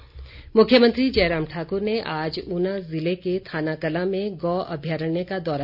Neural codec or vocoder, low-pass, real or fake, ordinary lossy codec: none; 5.4 kHz; real; none